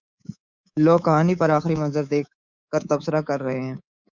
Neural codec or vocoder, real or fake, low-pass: codec, 24 kHz, 3.1 kbps, DualCodec; fake; 7.2 kHz